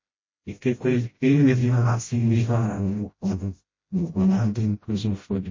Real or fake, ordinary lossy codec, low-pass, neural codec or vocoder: fake; MP3, 32 kbps; 7.2 kHz; codec, 16 kHz, 0.5 kbps, FreqCodec, smaller model